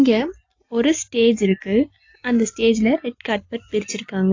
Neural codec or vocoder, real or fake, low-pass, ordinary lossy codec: none; real; 7.2 kHz; AAC, 48 kbps